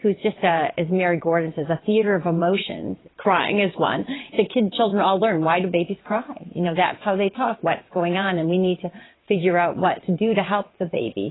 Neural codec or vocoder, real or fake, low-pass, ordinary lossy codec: vocoder, 22.05 kHz, 80 mel bands, WaveNeXt; fake; 7.2 kHz; AAC, 16 kbps